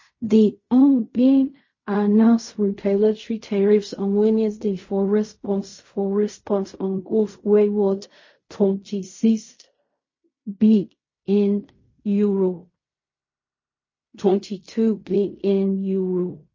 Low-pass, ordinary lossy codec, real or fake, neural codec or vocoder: 7.2 kHz; MP3, 32 kbps; fake; codec, 16 kHz in and 24 kHz out, 0.4 kbps, LongCat-Audio-Codec, fine tuned four codebook decoder